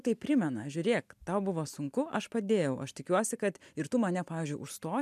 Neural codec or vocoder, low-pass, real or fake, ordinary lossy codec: none; 14.4 kHz; real; MP3, 96 kbps